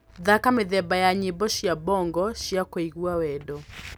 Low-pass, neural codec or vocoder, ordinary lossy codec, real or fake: none; none; none; real